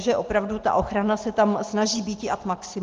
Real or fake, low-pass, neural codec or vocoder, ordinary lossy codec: real; 7.2 kHz; none; Opus, 16 kbps